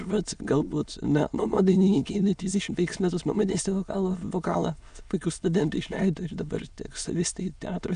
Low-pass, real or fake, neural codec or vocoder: 9.9 kHz; fake; autoencoder, 22.05 kHz, a latent of 192 numbers a frame, VITS, trained on many speakers